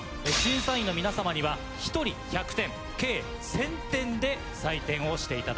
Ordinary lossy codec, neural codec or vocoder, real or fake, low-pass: none; none; real; none